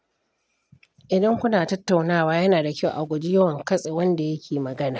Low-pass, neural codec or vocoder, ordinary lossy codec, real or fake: none; none; none; real